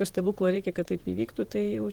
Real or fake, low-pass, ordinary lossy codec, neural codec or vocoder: fake; 19.8 kHz; Opus, 16 kbps; vocoder, 44.1 kHz, 128 mel bands, Pupu-Vocoder